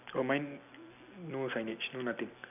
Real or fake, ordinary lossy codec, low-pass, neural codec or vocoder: real; none; 3.6 kHz; none